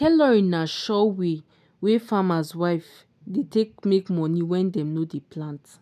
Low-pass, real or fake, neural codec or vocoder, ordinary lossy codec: 14.4 kHz; real; none; none